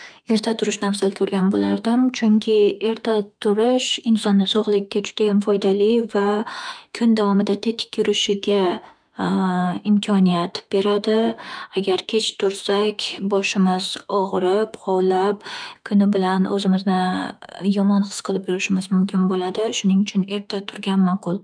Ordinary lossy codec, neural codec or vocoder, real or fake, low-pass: none; autoencoder, 48 kHz, 32 numbers a frame, DAC-VAE, trained on Japanese speech; fake; 9.9 kHz